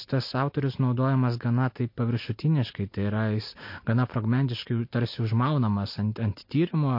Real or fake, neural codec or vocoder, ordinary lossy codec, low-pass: real; none; MP3, 32 kbps; 5.4 kHz